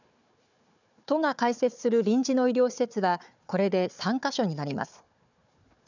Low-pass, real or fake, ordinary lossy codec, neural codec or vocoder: 7.2 kHz; fake; none; codec, 16 kHz, 4 kbps, FunCodec, trained on Chinese and English, 50 frames a second